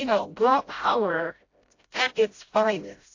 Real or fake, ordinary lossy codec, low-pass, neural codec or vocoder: fake; AAC, 48 kbps; 7.2 kHz; codec, 16 kHz, 0.5 kbps, FreqCodec, smaller model